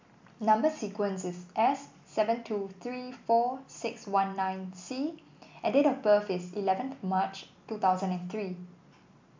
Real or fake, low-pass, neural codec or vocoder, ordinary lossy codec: real; 7.2 kHz; none; MP3, 64 kbps